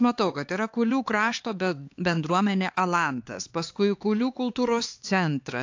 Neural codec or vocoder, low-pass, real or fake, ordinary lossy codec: codec, 16 kHz, 4 kbps, X-Codec, HuBERT features, trained on LibriSpeech; 7.2 kHz; fake; AAC, 48 kbps